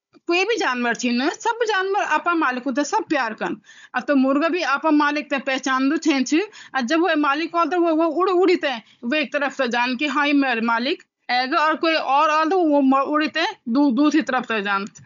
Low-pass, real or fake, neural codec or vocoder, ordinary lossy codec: 7.2 kHz; fake; codec, 16 kHz, 16 kbps, FunCodec, trained on Chinese and English, 50 frames a second; none